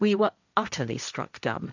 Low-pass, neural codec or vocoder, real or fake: 7.2 kHz; codec, 16 kHz, 1.1 kbps, Voila-Tokenizer; fake